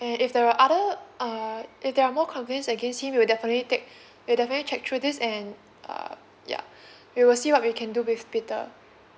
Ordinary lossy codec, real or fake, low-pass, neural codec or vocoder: none; real; none; none